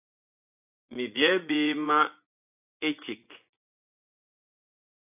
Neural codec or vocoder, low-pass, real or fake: vocoder, 24 kHz, 100 mel bands, Vocos; 3.6 kHz; fake